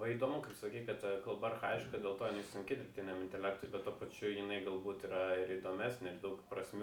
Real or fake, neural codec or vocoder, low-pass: real; none; 19.8 kHz